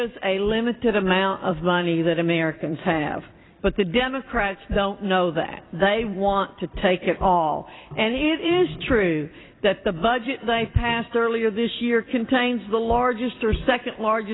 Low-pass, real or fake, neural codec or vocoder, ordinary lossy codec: 7.2 kHz; fake; vocoder, 44.1 kHz, 128 mel bands every 256 samples, BigVGAN v2; AAC, 16 kbps